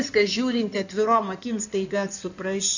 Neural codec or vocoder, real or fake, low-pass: codec, 16 kHz in and 24 kHz out, 2.2 kbps, FireRedTTS-2 codec; fake; 7.2 kHz